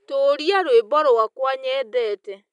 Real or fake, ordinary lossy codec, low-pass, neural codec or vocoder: real; none; 10.8 kHz; none